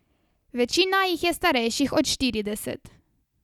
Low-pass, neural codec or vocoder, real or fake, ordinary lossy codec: 19.8 kHz; none; real; none